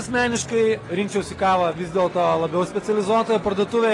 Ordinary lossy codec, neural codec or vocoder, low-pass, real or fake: AAC, 32 kbps; none; 10.8 kHz; real